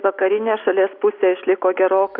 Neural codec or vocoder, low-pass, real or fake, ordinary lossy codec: none; 5.4 kHz; real; Opus, 64 kbps